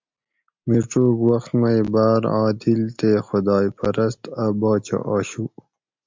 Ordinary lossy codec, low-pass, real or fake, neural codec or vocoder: MP3, 64 kbps; 7.2 kHz; real; none